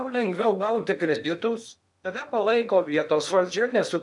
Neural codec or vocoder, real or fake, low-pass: codec, 16 kHz in and 24 kHz out, 0.8 kbps, FocalCodec, streaming, 65536 codes; fake; 10.8 kHz